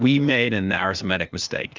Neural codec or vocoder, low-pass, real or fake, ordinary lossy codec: codec, 16 kHz, 0.8 kbps, ZipCodec; 7.2 kHz; fake; Opus, 32 kbps